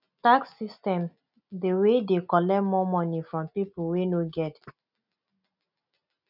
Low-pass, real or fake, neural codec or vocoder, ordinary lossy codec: 5.4 kHz; real; none; none